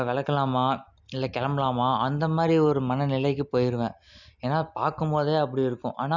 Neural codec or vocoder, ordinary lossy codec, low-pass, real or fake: none; none; 7.2 kHz; real